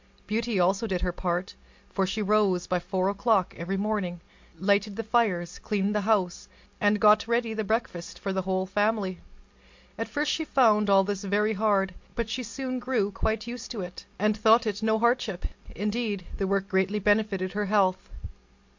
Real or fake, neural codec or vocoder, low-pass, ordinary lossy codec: real; none; 7.2 kHz; MP3, 48 kbps